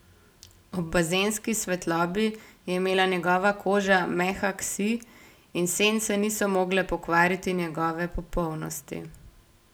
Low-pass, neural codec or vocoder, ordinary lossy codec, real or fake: none; none; none; real